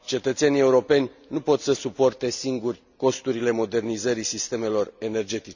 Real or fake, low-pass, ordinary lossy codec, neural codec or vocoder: real; 7.2 kHz; none; none